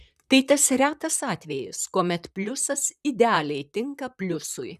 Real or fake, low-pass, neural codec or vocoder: fake; 14.4 kHz; vocoder, 44.1 kHz, 128 mel bands, Pupu-Vocoder